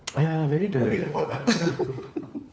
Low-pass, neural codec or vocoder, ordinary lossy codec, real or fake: none; codec, 16 kHz, 4 kbps, FunCodec, trained on LibriTTS, 50 frames a second; none; fake